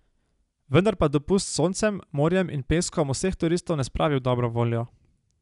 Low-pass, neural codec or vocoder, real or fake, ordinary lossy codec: 10.8 kHz; none; real; none